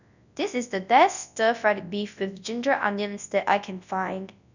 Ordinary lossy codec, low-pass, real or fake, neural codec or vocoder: none; 7.2 kHz; fake; codec, 24 kHz, 0.9 kbps, WavTokenizer, large speech release